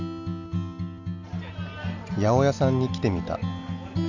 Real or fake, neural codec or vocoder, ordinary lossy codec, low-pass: real; none; none; 7.2 kHz